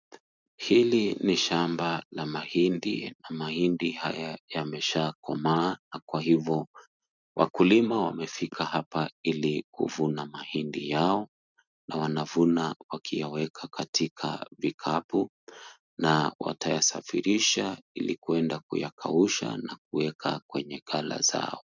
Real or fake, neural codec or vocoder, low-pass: real; none; 7.2 kHz